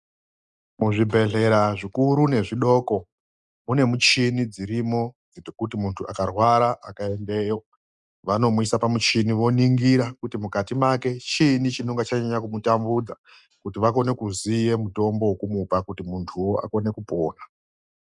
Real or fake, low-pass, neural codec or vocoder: real; 10.8 kHz; none